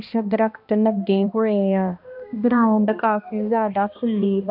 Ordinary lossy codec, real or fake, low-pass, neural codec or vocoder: none; fake; 5.4 kHz; codec, 16 kHz, 1 kbps, X-Codec, HuBERT features, trained on balanced general audio